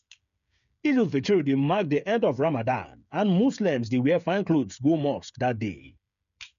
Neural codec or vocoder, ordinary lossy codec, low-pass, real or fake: codec, 16 kHz, 8 kbps, FreqCodec, smaller model; none; 7.2 kHz; fake